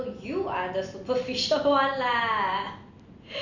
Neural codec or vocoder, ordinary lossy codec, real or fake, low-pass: none; none; real; 7.2 kHz